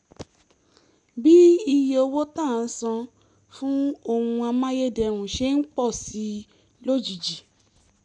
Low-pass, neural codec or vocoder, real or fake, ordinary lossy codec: 10.8 kHz; none; real; none